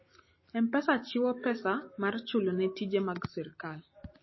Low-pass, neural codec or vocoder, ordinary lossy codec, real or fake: 7.2 kHz; none; MP3, 24 kbps; real